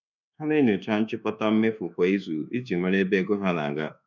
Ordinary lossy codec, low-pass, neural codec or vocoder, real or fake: none; 7.2 kHz; codec, 24 kHz, 1.2 kbps, DualCodec; fake